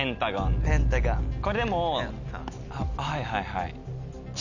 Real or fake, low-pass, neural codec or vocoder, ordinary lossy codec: real; 7.2 kHz; none; none